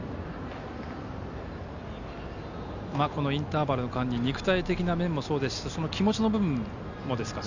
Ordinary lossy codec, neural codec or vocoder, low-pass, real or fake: none; none; 7.2 kHz; real